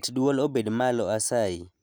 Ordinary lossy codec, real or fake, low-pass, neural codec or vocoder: none; real; none; none